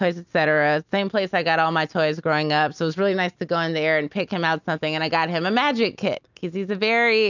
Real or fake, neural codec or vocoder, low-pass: real; none; 7.2 kHz